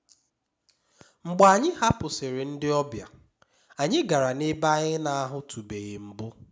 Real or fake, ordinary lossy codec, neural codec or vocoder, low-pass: real; none; none; none